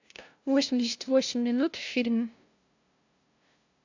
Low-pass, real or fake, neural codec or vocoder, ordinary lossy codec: 7.2 kHz; fake; codec, 16 kHz, 0.5 kbps, FunCodec, trained on LibriTTS, 25 frames a second; AAC, 48 kbps